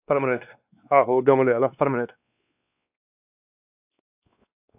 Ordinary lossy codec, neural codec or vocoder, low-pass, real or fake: AAC, 32 kbps; codec, 16 kHz, 2 kbps, X-Codec, WavLM features, trained on Multilingual LibriSpeech; 3.6 kHz; fake